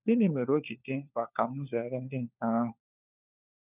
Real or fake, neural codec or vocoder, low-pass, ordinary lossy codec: fake; codec, 16 kHz, 4 kbps, FunCodec, trained on LibriTTS, 50 frames a second; 3.6 kHz; none